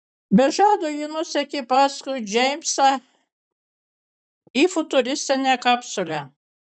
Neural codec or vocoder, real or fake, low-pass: vocoder, 44.1 kHz, 128 mel bands, Pupu-Vocoder; fake; 9.9 kHz